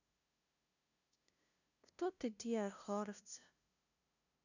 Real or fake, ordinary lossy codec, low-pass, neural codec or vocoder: fake; none; 7.2 kHz; codec, 16 kHz, 0.5 kbps, FunCodec, trained on LibriTTS, 25 frames a second